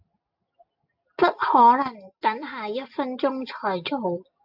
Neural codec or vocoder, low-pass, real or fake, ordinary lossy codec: none; 5.4 kHz; real; AAC, 48 kbps